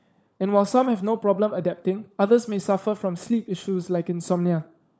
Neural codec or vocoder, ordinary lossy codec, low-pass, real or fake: codec, 16 kHz, 16 kbps, FunCodec, trained on LibriTTS, 50 frames a second; none; none; fake